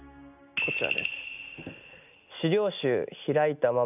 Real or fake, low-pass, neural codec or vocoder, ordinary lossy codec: real; 3.6 kHz; none; none